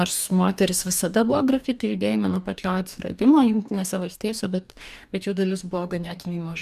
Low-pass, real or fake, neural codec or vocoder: 14.4 kHz; fake; codec, 44.1 kHz, 2.6 kbps, DAC